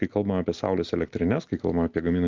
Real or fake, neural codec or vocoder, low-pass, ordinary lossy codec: real; none; 7.2 kHz; Opus, 32 kbps